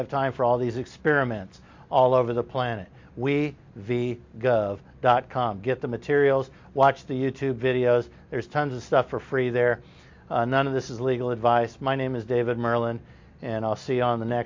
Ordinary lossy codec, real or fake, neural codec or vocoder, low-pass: MP3, 48 kbps; real; none; 7.2 kHz